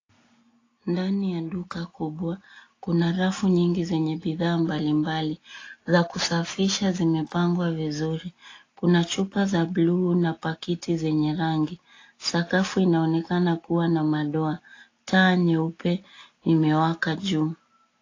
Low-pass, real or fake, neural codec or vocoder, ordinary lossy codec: 7.2 kHz; real; none; AAC, 32 kbps